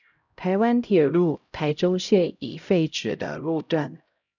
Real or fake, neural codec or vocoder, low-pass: fake; codec, 16 kHz, 0.5 kbps, X-Codec, HuBERT features, trained on LibriSpeech; 7.2 kHz